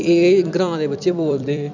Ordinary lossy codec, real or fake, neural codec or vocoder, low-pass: none; fake; vocoder, 44.1 kHz, 128 mel bands every 256 samples, BigVGAN v2; 7.2 kHz